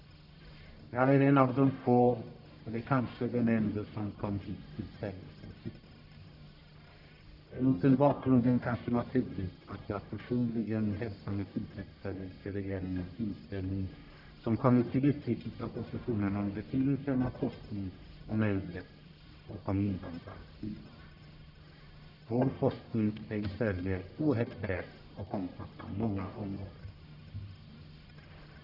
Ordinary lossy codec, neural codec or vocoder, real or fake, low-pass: none; codec, 44.1 kHz, 1.7 kbps, Pupu-Codec; fake; 5.4 kHz